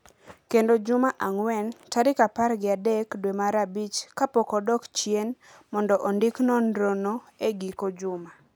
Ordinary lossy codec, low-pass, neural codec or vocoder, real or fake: none; none; none; real